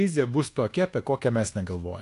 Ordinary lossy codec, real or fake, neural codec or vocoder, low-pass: AAC, 48 kbps; fake; codec, 24 kHz, 1.2 kbps, DualCodec; 10.8 kHz